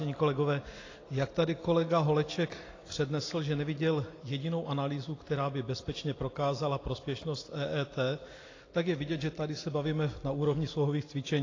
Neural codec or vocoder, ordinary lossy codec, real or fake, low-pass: none; AAC, 32 kbps; real; 7.2 kHz